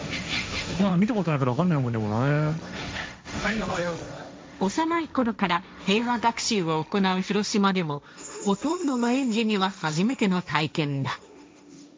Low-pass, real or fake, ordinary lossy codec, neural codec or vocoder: none; fake; none; codec, 16 kHz, 1.1 kbps, Voila-Tokenizer